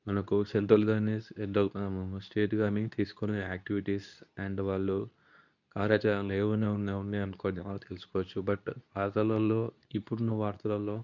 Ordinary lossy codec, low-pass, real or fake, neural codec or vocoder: none; 7.2 kHz; fake; codec, 24 kHz, 0.9 kbps, WavTokenizer, medium speech release version 2